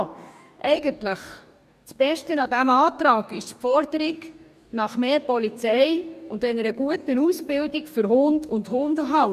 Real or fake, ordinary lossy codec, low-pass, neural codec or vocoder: fake; none; 14.4 kHz; codec, 44.1 kHz, 2.6 kbps, DAC